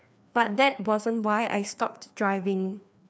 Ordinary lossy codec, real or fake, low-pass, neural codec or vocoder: none; fake; none; codec, 16 kHz, 2 kbps, FreqCodec, larger model